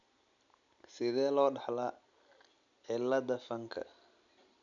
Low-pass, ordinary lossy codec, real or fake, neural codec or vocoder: 7.2 kHz; none; real; none